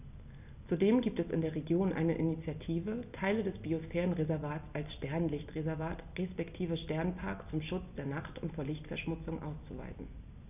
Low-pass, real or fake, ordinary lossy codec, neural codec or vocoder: 3.6 kHz; real; none; none